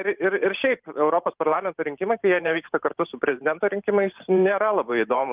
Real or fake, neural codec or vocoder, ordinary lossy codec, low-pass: fake; vocoder, 44.1 kHz, 128 mel bands every 512 samples, BigVGAN v2; Opus, 32 kbps; 3.6 kHz